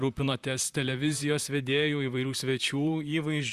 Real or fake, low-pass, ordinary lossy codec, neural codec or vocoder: fake; 14.4 kHz; Opus, 64 kbps; vocoder, 44.1 kHz, 128 mel bands, Pupu-Vocoder